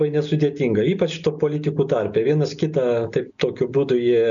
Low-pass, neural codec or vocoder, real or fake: 7.2 kHz; none; real